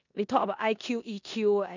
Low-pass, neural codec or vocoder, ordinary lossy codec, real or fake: 7.2 kHz; codec, 16 kHz in and 24 kHz out, 0.9 kbps, LongCat-Audio-Codec, four codebook decoder; none; fake